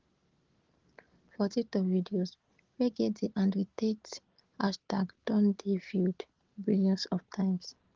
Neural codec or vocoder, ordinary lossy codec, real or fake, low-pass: none; Opus, 16 kbps; real; 7.2 kHz